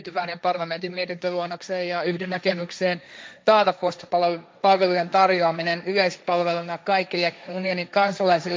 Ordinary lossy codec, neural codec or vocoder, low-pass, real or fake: none; codec, 16 kHz, 1.1 kbps, Voila-Tokenizer; none; fake